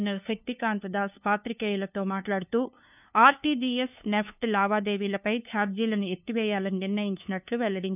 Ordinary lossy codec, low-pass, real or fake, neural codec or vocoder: none; 3.6 kHz; fake; codec, 16 kHz, 2 kbps, FunCodec, trained on LibriTTS, 25 frames a second